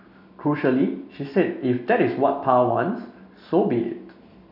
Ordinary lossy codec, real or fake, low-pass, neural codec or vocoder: none; real; 5.4 kHz; none